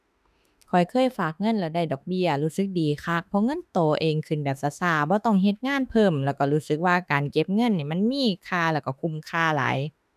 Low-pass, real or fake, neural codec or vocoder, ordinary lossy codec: 14.4 kHz; fake; autoencoder, 48 kHz, 32 numbers a frame, DAC-VAE, trained on Japanese speech; none